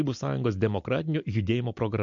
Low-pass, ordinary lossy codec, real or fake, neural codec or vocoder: 7.2 kHz; MP3, 48 kbps; real; none